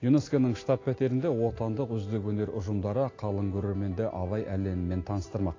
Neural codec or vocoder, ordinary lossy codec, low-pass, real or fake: none; AAC, 32 kbps; 7.2 kHz; real